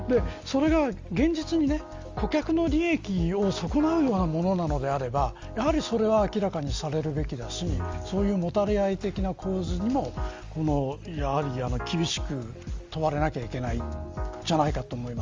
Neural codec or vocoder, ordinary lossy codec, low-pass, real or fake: none; Opus, 32 kbps; 7.2 kHz; real